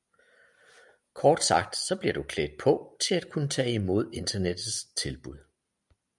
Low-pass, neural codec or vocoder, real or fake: 10.8 kHz; none; real